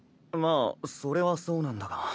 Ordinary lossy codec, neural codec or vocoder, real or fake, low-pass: none; none; real; none